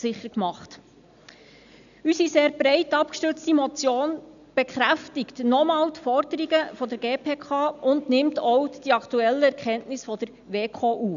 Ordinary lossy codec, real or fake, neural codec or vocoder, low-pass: none; real; none; 7.2 kHz